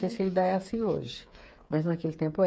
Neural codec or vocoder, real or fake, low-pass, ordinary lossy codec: codec, 16 kHz, 8 kbps, FreqCodec, smaller model; fake; none; none